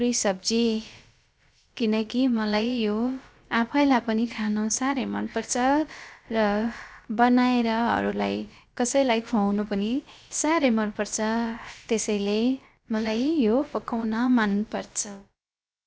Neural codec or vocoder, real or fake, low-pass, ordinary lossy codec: codec, 16 kHz, about 1 kbps, DyCAST, with the encoder's durations; fake; none; none